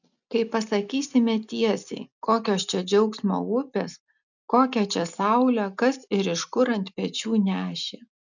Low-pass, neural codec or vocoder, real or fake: 7.2 kHz; none; real